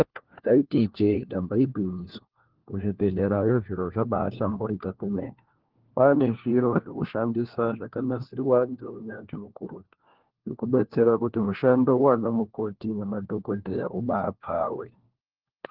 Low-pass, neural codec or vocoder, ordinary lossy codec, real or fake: 5.4 kHz; codec, 16 kHz, 1 kbps, FunCodec, trained on LibriTTS, 50 frames a second; Opus, 16 kbps; fake